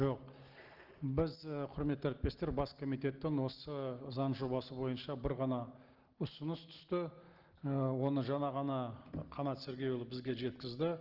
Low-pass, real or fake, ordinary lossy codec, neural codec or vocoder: 5.4 kHz; real; Opus, 16 kbps; none